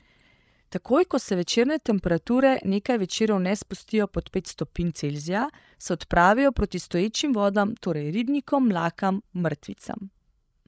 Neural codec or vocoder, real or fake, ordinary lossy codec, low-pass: codec, 16 kHz, 16 kbps, FreqCodec, larger model; fake; none; none